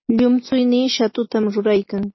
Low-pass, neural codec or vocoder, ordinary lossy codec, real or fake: 7.2 kHz; none; MP3, 24 kbps; real